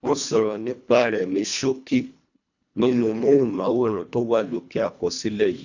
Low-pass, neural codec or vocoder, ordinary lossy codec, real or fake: 7.2 kHz; codec, 24 kHz, 1.5 kbps, HILCodec; none; fake